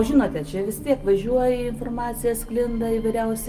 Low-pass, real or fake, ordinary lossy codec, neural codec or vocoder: 14.4 kHz; real; Opus, 32 kbps; none